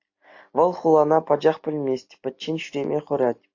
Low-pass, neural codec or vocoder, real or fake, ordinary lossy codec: 7.2 kHz; none; real; AAC, 48 kbps